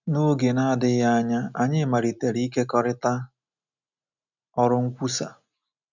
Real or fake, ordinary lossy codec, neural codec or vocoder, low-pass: real; none; none; 7.2 kHz